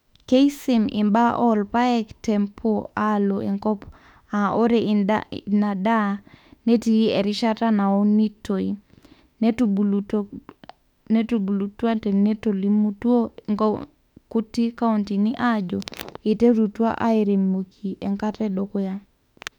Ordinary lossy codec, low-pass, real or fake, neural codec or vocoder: none; 19.8 kHz; fake; autoencoder, 48 kHz, 32 numbers a frame, DAC-VAE, trained on Japanese speech